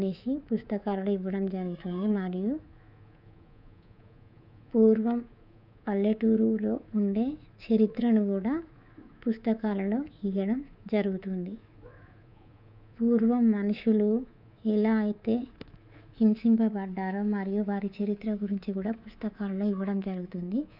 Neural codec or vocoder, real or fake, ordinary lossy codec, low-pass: codec, 24 kHz, 3.1 kbps, DualCodec; fake; none; 5.4 kHz